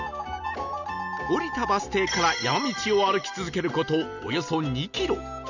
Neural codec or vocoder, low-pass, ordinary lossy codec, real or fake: none; 7.2 kHz; none; real